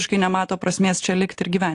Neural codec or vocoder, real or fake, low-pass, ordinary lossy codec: none; real; 10.8 kHz; AAC, 48 kbps